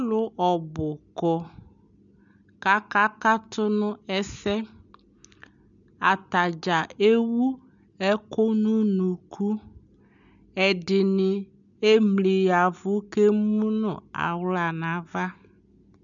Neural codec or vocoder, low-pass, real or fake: none; 7.2 kHz; real